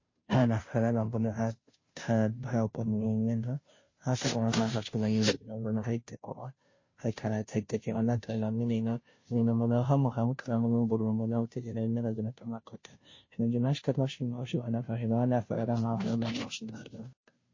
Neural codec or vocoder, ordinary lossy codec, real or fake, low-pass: codec, 16 kHz, 0.5 kbps, FunCodec, trained on Chinese and English, 25 frames a second; MP3, 32 kbps; fake; 7.2 kHz